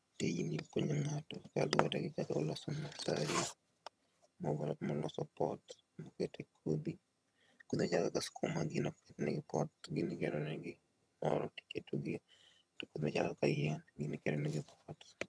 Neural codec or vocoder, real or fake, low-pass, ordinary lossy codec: vocoder, 22.05 kHz, 80 mel bands, HiFi-GAN; fake; none; none